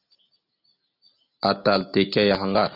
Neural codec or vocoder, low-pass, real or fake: none; 5.4 kHz; real